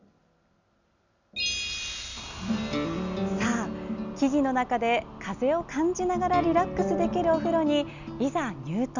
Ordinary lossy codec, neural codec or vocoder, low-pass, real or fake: none; none; 7.2 kHz; real